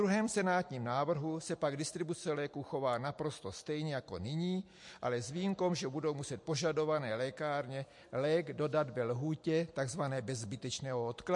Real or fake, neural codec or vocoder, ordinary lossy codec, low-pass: real; none; MP3, 48 kbps; 10.8 kHz